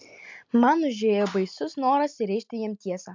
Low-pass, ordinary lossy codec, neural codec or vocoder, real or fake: 7.2 kHz; MP3, 64 kbps; none; real